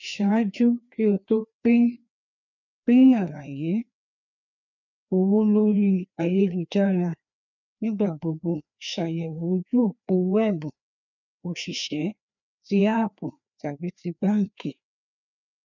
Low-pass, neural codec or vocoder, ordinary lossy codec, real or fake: 7.2 kHz; codec, 16 kHz, 2 kbps, FreqCodec, larger model; none; fake